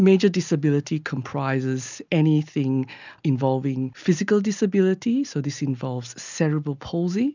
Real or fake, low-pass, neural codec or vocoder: real; 7.2 kHz; none